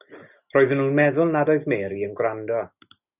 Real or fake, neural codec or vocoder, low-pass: real; none; 3.6 kHz